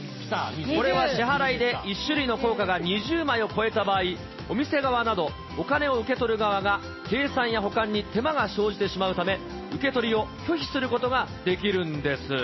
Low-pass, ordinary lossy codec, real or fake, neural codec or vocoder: 7.2 kHz; MP3, 24 kbps; real; none